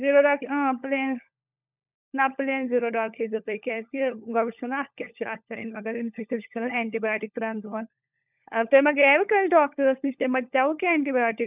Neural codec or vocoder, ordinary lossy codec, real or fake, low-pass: codec, 16 kHz, 16 kbps, FunCodec, trained on LibriTTS, 50 frames a second; none; fake; 3.6 kHz